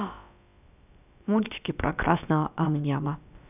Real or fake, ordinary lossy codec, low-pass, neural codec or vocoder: fake; none; 3.6 kHz; codec, 16 kHz, about 1 kbps, DyCAST, with the encoder's durations